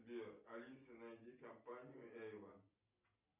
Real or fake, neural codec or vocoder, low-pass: real; none; 3.6 kHz